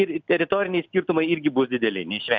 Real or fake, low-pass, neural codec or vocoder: real; 7.2 kHz; none